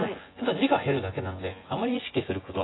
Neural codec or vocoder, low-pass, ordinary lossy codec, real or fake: vocoder, 24 kHz, 100 mel bands, Vocos; 7.2 kHz; AAC, 16 kbps; fake